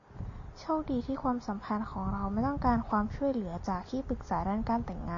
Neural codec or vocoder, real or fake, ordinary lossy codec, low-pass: none; real; AAC, 48 kbps; 7.2 kHz